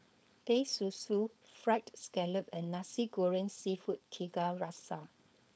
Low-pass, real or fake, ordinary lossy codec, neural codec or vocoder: none; fake; none; codec, 16 kHz, 4.8 kbps, FACodec